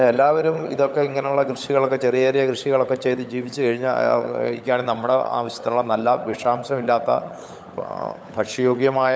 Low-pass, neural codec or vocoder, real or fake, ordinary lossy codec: none; codec, 16 kHz, 16 kbps, FunCodec, trained on LibriTTS, 50 frames a second; fake; none